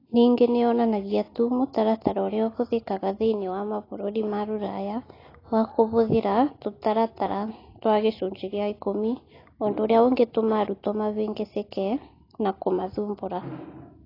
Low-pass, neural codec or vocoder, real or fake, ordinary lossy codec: 5.4 kHz; none; real; AAC, 24 kbps